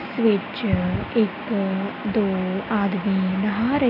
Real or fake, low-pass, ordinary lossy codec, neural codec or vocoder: real; 5.4 kHz; none; none